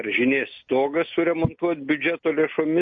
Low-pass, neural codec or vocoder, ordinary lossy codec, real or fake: 7.2 kHz; none; MP3, 32 kbps; real